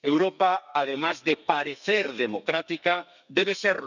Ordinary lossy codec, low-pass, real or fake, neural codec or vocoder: none; 7.2 kHz; fake; codec, 32 kHz, 1.9 kbps, SNAC